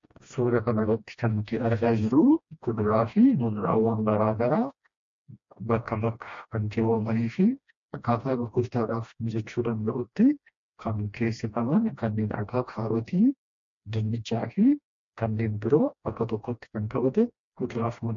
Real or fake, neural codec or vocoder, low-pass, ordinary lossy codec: fake; codec, 16 kHz, 1 kbps, FreqCodec, smaller model; 7.2 kHz; MP3, 48 kbps